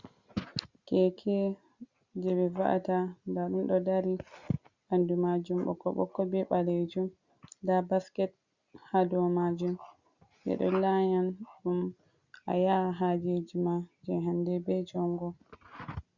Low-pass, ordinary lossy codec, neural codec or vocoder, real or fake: 7.2 kHz; Opus, 64 kbps; none; real